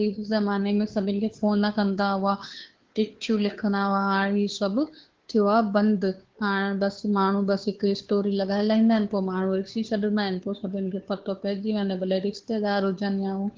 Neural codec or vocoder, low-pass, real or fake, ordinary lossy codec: codec, 16 kHz, 2 kbps, FunCodec, trained on Chinese and English, 25 frames a second; 7.2 kHz; fake; Opus, 16 kbps